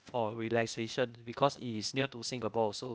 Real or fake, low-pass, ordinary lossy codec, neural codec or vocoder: fake; none; none; codec, 16 kHz, 0.8 kbps, ZipCodec